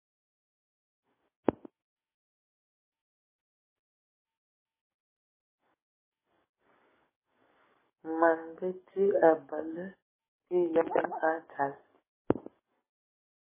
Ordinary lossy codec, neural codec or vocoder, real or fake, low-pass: MP3, 16 kbps; codec, 44.1 kHz, 7.8 kbps, DAC; fake; 3.6 kHz